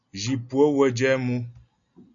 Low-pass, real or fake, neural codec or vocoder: 7.2 kHz; real; none